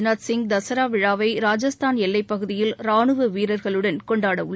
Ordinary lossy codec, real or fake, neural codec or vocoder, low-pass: none; real; none; none